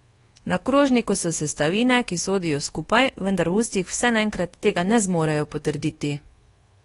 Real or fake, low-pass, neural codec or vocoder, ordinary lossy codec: fake; 10.8 kHz; codec, 24 kHz, 1.2 kbps, DualCodec; AAC, 32 kbps